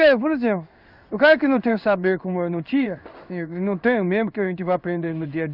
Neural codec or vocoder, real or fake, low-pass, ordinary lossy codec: codec, 16 kHz in and 24 kHz out, 1 kbps, XY-Tokenizer; fake; 5.4 kHz; none